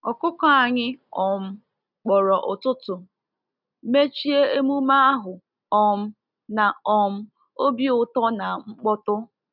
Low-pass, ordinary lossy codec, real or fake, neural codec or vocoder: 5.4 kHz; none; real; none